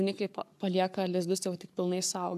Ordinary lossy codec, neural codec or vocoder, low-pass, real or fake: AAC, 96 kbps; codec, 44.1 kHz, 7.8 kbps, Pupu-Codec; 14.4 kHz; fake